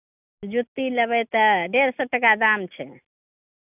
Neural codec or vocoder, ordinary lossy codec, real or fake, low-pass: none; none; real; 3.6 kHz